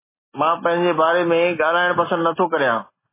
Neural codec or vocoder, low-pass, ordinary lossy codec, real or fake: none; 3.6 kHz; MP3, 16 kbps; real